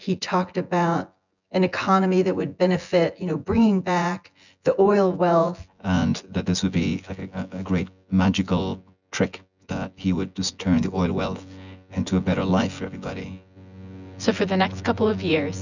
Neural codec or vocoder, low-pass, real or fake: vocoder, 24 kHz, 100 mel bands, Vocos; 7.2 kHz; fake